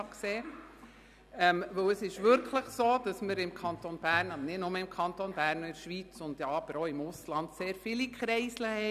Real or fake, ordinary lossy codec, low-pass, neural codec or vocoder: real; none; 14.4 kHz; none